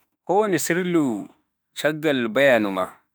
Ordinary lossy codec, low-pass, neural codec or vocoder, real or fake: none; none; autoencoder, 48 kHz, 32 numbers a frame, DAC-VAE, trained on Japanese speech; fake